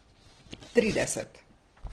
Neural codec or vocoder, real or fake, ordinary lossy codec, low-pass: none; real; Opus, 16 kbps; 9.9 kHz